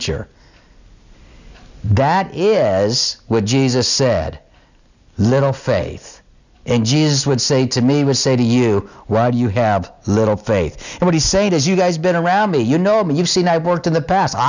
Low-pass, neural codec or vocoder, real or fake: 7.2 kHz; none; real